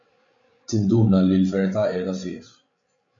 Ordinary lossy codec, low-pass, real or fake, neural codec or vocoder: AAC, 64 kbps; 7.2 kHz; fake; codec, 16 kHz, 16 kbps, FreqCodec, larger model